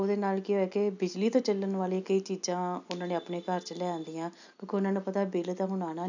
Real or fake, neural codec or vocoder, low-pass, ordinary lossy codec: real; none; 7.2 kHz; none